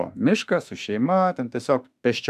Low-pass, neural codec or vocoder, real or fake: 14.4 kHz; autoencoder, 48 kHz, 32 numbers a frame, DAC-VAE, trained on Japanese speech; fake